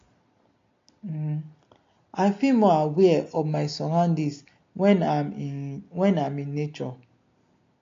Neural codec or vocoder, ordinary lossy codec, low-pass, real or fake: none; AAC, 48 kbps; 7.2 kHz; real